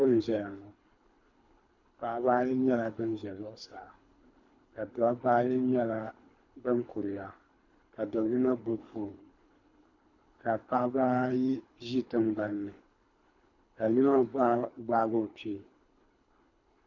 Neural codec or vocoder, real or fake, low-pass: codec, 24 kHz, 3 kbps, HILCodec; fake; 7.2 kHz